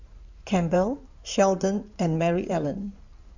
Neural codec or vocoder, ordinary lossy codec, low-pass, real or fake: codec, 16 kHz in and 24 kHz out, 2.2 kbps, FireRedTTS-2 codec; none; 7.2 kHz; fake